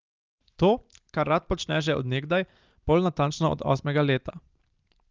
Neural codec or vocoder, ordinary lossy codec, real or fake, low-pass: none; Opus, 32 kbps; real; 7.2 kHz